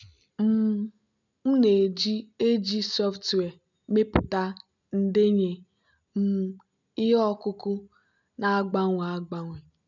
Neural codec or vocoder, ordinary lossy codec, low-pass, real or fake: none; none; 7.2 kHz; real